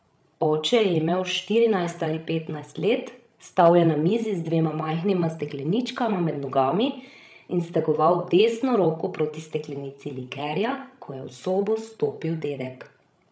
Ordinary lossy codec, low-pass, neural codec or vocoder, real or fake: none; none; codec, 16 kHz, 16 kbps, FreqCodec, larger model; fake